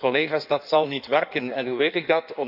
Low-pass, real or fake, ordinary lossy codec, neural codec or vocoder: 5.4 kHz; fake; none; codec, 16 kHz in and 24 kHz out, 1.1 kbps, FireRedTTS-2 codec